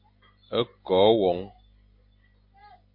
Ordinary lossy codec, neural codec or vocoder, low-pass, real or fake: MP3, 32 kbps; none; 5.4 kHz; real